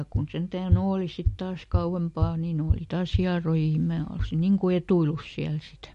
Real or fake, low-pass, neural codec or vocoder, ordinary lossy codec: real; 14.4 kHz; none; MP3, 48 kbps